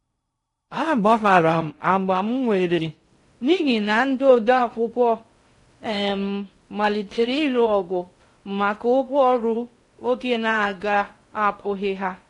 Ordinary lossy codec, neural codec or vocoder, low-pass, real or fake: AAC, 48 kbps; codec, 16 kHz in and 24 kHz out, 0.6 kbps, FocalCodec, streaming, 2048 codes; 10.8 kHz; fake